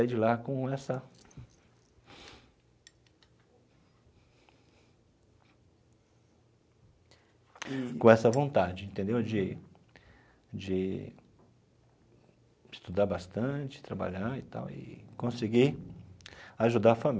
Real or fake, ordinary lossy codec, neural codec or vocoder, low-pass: real; none; none; none